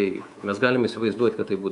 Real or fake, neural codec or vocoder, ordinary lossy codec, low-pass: fake; codec, 24 kHz, 3.1 kbps, DualCodec; Opus, 64 kbps; 10.8 kHz